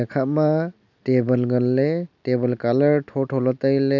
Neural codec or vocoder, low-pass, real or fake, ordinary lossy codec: none; 7.2 kHz; real; none